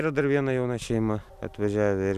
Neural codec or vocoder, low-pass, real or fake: none; 14.4 kHz; real